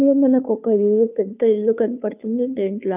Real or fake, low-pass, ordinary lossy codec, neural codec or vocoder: fake; 3.6 kHz; none; codec, 16 kHz, 2 kbps, FunCodec, trained on Chinese and English, 25 frames a second